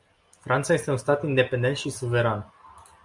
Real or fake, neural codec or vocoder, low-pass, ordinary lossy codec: real; none; 10.8 kHz; Opus, 64 kbps